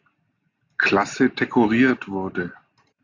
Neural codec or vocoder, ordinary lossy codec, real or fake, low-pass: none; AAC, 48 kbps; real; 7.2 kHz